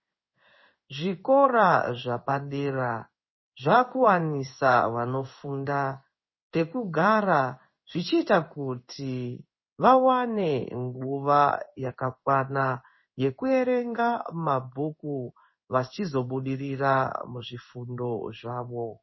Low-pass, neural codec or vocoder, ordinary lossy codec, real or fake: 7.2 kHz; codec, 16 kHz in and 24 kHz out, 1 kbps, XY-Tokenizer; MP3, 24 kbps; fake